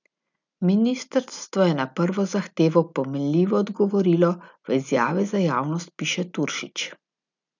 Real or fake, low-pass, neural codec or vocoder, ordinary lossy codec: real; 7.2 kHz; none; none